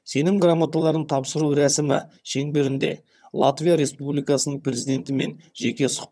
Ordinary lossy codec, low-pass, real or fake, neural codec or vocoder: none; none; fake; vocoder, 22.05 kHz, 80 mel bands, HiFi-GAN